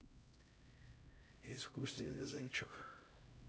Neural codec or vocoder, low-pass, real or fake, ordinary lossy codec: codec, 16 kHz, 0.5 kbps, X-Codec, HuBERT features, trained on LibriSpeech; none; fake; none